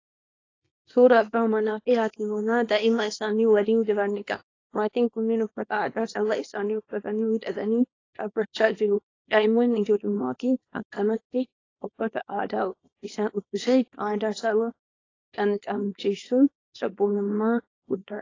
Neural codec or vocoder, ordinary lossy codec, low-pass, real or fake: codec, 24 kHz, 0.9 kbps, WavTokenizer, small release; AAC, 32 kbps; 7.2 kHz; fake